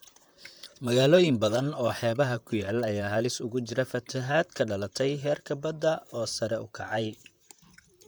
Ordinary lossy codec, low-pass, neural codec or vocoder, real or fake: none; none; vocoder, 44.1 kHz, 128 mel bands, Pupu-Vocoder; fake